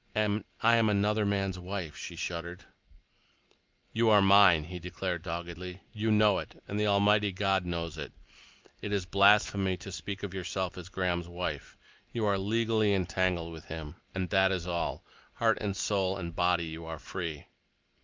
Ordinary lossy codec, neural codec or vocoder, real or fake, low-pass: Opus, 24 kbps; none; real; 7.2 kHz